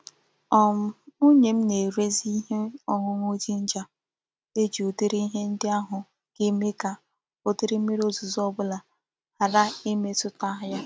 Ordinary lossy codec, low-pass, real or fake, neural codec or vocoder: none; none; real; none